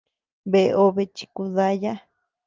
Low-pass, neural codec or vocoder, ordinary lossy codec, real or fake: 7.2 kHz; none; Opus, 24 kbps; real